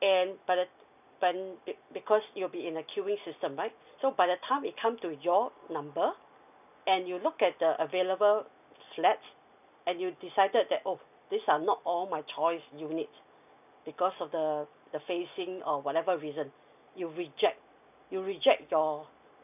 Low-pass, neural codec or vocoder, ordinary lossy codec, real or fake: 3.6 kHz; none; none; real